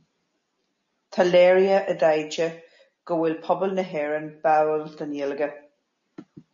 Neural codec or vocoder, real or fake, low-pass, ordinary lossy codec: none; real; 7.2 kHz; MP3, 32 kbps